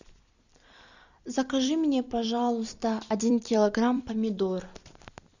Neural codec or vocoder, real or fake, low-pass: vocoder, 44.1 kHz, 128 mel bands every 256 samples, BigVGAN v2; fake; 7.2 kHz